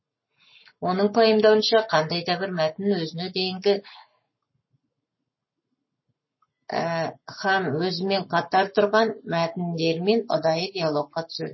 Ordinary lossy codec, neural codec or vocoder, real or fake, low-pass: MP3, 24 kbps; codec, 44.1 kHz, 7.8 kbps, Pupu-Codec; fake; 7.2 kHz